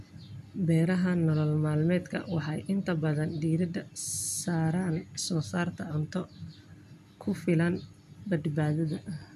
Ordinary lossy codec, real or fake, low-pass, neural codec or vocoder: none; real; 14.4 kHz; none